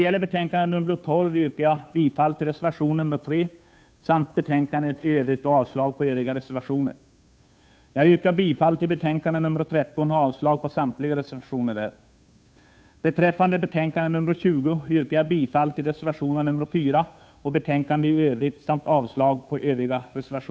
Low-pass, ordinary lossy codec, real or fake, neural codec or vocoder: none; none; fake; codec, 16 kHz, 2 kbps, FunCodec, trained on Chinese and English, 25 frames a second